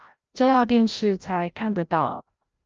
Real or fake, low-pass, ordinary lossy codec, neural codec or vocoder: fake; 7.2 kHz; Opus, 24 kbps; codec, 16 kHz, 0.5 kbps, FreqCodec, larger model